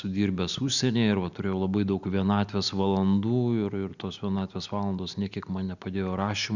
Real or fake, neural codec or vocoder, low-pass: real; none; 7.2 kHz